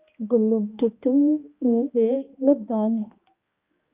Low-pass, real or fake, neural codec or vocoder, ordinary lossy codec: 3.6 kHz; fake; codec, 16 kHz, 1 kbps, X-Codec, HuBERT features, trained on balanced general audio; Opus, 24 kbps